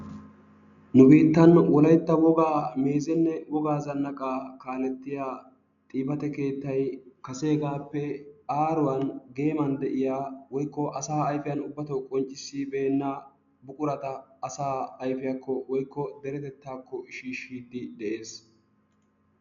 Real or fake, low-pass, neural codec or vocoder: real; 7.2 kHz; none